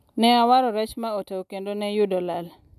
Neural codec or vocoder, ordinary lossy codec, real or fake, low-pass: none; none; real; 14.4 kHz